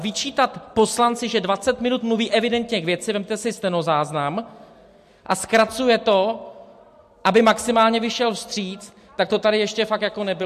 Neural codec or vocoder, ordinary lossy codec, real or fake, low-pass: none; MP3, 64 kbps; real; 14.4 kHz